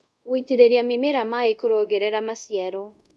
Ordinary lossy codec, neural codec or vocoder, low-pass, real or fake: none; codec, 24 kHz, 0.5 kbps, DualCodec; none; fake